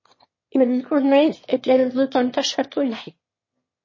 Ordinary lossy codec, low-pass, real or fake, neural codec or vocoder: MP3, 32 kbps; 7.2 kHz; fake; autoencoder, 22.05 kHz, a latent of 192 numbers a frame, VITS, trained on one speaker